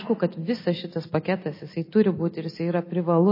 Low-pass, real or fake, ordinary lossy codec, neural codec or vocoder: 5.4 kHz; real; MP3, 24 kbps; none